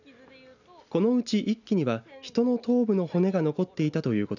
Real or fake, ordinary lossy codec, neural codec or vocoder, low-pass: real; none; none; 7.2 kHz